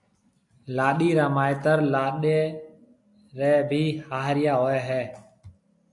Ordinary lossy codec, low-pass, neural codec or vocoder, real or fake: AAC, 64 kbps; 10.8 kHz; none; real